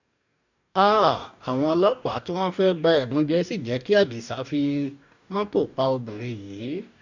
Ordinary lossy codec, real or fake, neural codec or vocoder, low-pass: none; fake; codec, 44.1 kHz, 2.6 kbps, DAC; 7.2 kHz